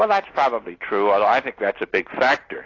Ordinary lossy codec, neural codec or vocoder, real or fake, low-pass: AAC, 32 kbps; none; real; 7.2 kHz